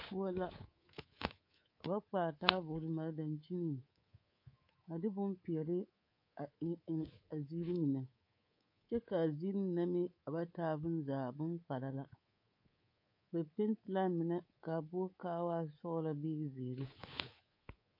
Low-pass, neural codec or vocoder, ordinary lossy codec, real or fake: 5.4 kHz; codec, 24 kHz, 3.1 kbps, DualCodec; MP3, 32 kbps; fake